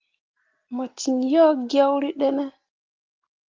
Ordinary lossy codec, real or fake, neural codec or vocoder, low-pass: Opus, 32 kbps; real; none; 7.2 kHz